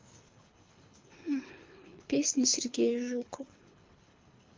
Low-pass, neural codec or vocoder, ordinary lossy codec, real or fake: 7.2 kHz; codec, 24 kHz, 3 kbps, HILCodec; Opus, 24 kbps; fake